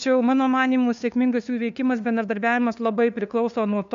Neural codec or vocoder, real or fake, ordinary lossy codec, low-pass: codec, 16 kHz, 2 kbps, FunCodec, trained on LibriTTS, 25 frames a second; fake; MP3, 64 kbps; 7.2 kHz